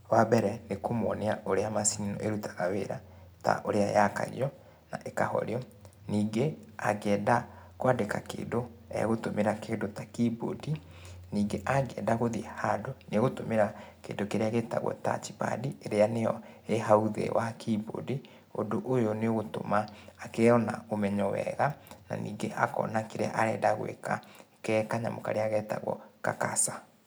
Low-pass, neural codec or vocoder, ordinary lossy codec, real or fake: none; none; none; real